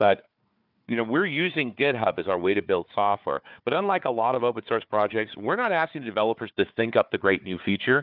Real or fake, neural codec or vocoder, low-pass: fake; codec, 16 kHz, 4 kbps, FunCodec, trained on Chinese and English, 50 frames a second; 5.4 kHz